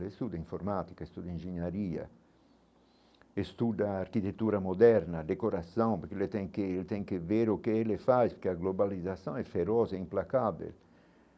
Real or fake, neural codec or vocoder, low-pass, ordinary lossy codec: real; none; none; none